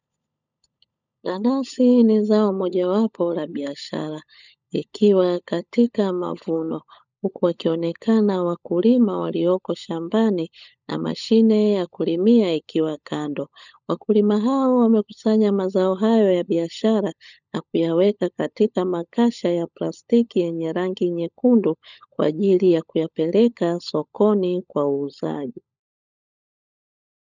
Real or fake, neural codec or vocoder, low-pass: fake; codec, 16 kHz, 16 kbps, FunCodec, trained on LibriTTS, 50 frames a second; 7.2 kHz